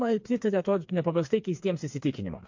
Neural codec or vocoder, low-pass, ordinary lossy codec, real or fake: codec, 16 kHz, 4 kbps, FreqCodec, smaller model; 7.2 kHz; MP3, 48 kbps; fake